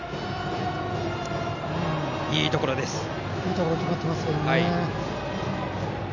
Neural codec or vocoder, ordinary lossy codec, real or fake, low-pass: none; none; real; 7.2 kHz